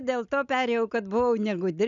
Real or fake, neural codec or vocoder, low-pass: real; none; 7.2 kHz